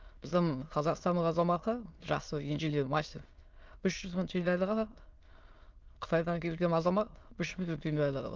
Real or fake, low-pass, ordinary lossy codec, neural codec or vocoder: fake; 7.2 kHz; Opus, 24 kbps; autoencoder, 22.05 kHz, a latent of 192 numbers a frame, VITS, trained on many speakers